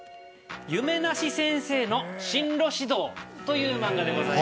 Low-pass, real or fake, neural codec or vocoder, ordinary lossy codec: none; real; none; none